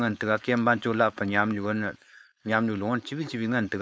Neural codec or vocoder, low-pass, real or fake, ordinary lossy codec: codec, 16 kHz, 4.8 kbps, FACodec; none; fake; none